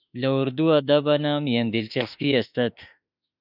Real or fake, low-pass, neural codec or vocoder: fake; 5.4 kHz; autoencoder, 48 kHz, 32 numbers a frame, DAC-VAE, trained on Japanese speech